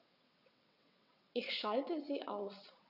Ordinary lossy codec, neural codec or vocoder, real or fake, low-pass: none; vocoder, 22.05 kHz, 80 mel bands, WaveNeXt; fake; 5.4 kHz